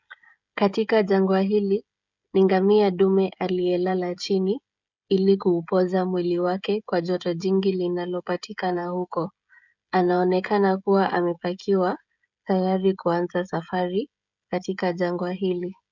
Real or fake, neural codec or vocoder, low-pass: fake; codec, 16 kHz, 16 kbps, FreqCodec, smaller model; 7.2 kHz